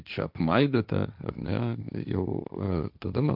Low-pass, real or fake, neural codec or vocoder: 5.4 kHz; fake; codec, 16 kHz, 1.1 kbps, Voila-Tokenizer